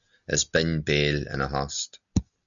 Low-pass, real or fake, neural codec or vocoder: 7.2 kHz; real; none